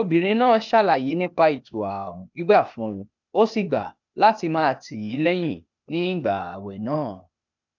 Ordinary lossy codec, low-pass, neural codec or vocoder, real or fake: none; 7.2 kHz; codec, 16 kHz, 0.8 kbps, ZipCodec; fake